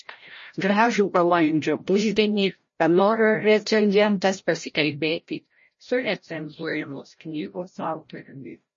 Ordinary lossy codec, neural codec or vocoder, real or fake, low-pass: MP3, 32 kbps; codec, 16 kHz, 0.5 kbps, FreqCodec, larger model; fake; 7.2 kHz